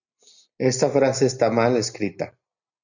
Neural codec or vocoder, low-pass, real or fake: none; 7.2 kHz; real